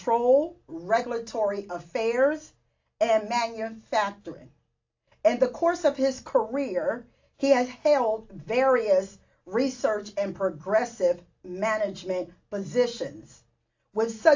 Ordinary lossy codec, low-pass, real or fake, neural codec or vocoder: AAC, 48 kbps; 7.2 kHz; real; none